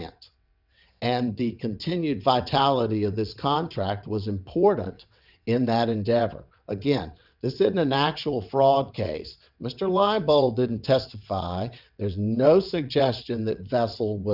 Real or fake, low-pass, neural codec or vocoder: fake; 5.4 kHz; vocoder, 22.05 kHz, 80 mel bands, WaveNeXt